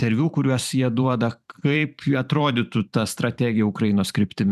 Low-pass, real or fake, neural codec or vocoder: 14.4 kHz; fake; vocoder, 44.1 kHz, 128 mel bands every 512 samples, BigVGAN v2